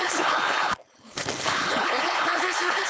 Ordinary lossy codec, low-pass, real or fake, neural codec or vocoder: none; none; fake; codec, 16 kHz, 4.8 kbps, FACodec